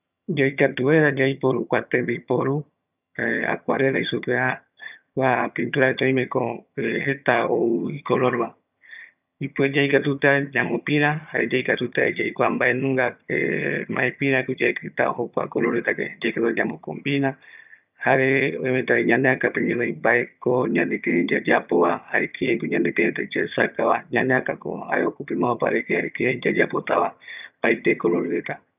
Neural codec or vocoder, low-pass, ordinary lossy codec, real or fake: vocoder, 22.05 kHz, 80 mel bands, HiFi-GAN; 3.6 kHz; none; fake